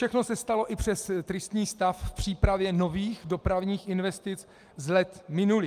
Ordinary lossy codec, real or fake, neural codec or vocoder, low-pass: Opus, 32 kbps; real; none; 14.4 kHz